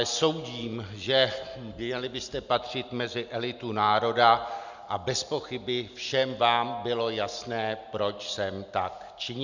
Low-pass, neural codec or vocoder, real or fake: 7.2 kHz; none; real